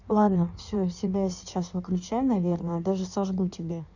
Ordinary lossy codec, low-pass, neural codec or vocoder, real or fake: none; 7.2 kHz; codec, 16 kHz in and 24 kHz out, 1.1 kbps, FireRedTTS-2 codec; fake